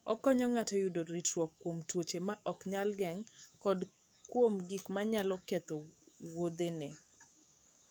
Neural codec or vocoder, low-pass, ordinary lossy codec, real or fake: codec, 44.1 kHz, 7.8 kbps, DAC; none; none; fake